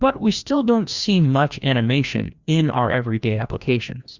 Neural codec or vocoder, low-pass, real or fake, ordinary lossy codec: codec, 16 kHz, 1 kbps, FreqCodec, larger model; 7.2 kHz; fake; Opus, 64 kbps